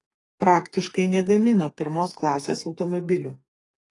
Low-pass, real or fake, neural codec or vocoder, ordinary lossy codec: 10.8 kHz; fake; codec, 32 kHz, 1.9 kbps, SNAC; AAC, 32 kbps